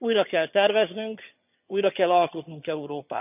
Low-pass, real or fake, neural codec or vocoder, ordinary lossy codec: 3.6 kHz; fake; vocoder, 22.05 kHz, 80 mel bands, HiFi-GAN; none